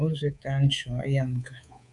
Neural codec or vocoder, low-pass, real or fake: codec, 24 kHz, 3.1 kbps, DualCodec; 10.8 kHz; fake